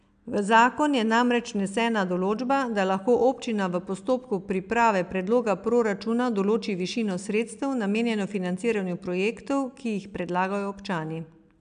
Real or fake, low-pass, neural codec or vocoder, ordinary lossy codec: real; 9.9 kHz; none; AAC, 96 kbps